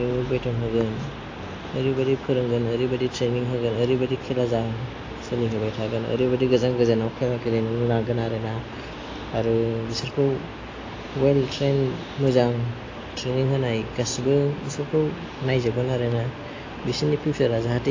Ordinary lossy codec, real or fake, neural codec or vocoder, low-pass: AAC, 32 kbps; real; none; 7.2 kHz